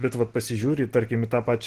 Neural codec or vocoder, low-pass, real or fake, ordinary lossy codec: none; 14.4 kHz; real; Opus, 16 kbps